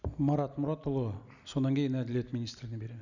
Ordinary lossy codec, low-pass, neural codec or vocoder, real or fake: none; 7.2 kHz; none; real